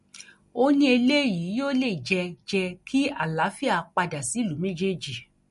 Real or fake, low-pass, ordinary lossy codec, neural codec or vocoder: real; 14.4 kHz; MP3, 48 kbps; none